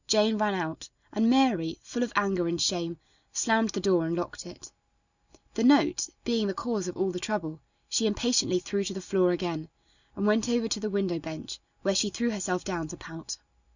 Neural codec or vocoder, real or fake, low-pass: none; real; 7.2 kHz